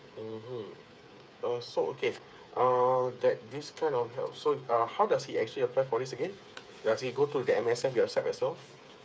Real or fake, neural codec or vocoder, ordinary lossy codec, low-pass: fake; codec, 16 kHz, 8 kbps, FreqCodec, smaller model; none; none